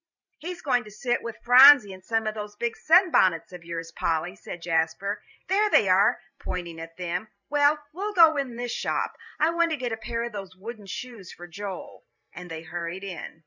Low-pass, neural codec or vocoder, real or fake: 7.2 kHz; vocoder, 44.1 kHz, 128 mel bands every 512 samples, BigVGAN v2; fake